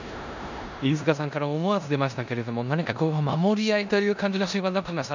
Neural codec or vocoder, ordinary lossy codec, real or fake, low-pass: codec, 16 kHz in and 24 kHz out, 0.9 kbps, LongCat-Audio-Codec, four codebook decoder; none; fake; 7.2 kHz